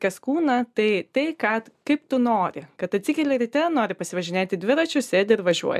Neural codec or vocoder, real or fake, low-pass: none; real; 14.4 kHz